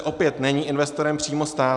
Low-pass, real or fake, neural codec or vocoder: 10.8 kHz; real; none